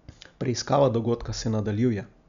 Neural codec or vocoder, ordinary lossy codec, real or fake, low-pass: none; none; real; 7.2 kHz